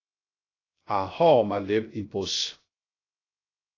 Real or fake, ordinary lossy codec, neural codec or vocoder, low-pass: fake; AAC, 32 kbps; codec, 16 kHz, 0.3 kbps, FocalCodec; 7.2 kHz